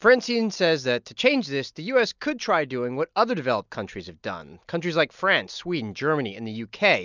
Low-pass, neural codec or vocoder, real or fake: 7.2 kHz; none; real